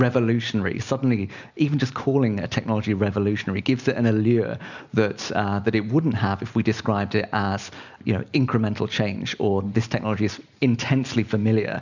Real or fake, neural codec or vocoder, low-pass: real; none; 7.2 kHz